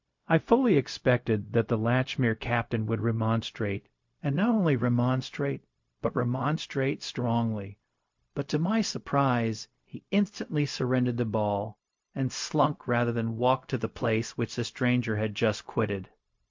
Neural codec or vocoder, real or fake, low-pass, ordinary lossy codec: codec, 16 kHz, 0.4 kbps, LongCat-Audio-Codec; fake; 7.2 kHz; MP3, 48 kbps